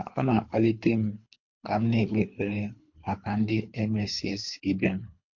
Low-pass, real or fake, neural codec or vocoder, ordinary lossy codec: 7.2 kHz; fake; codec, 24 kHz, 3 kbps, HILCodec; MP3, 48 kbps